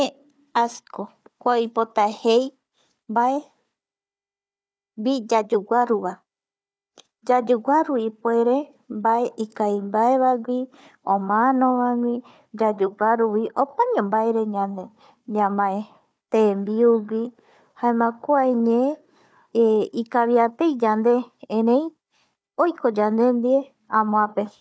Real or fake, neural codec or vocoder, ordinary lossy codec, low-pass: fake; codec, 16 kHz, 4 kbps, FunCodec, trained on Chinese and English, 50 frames a second; none; none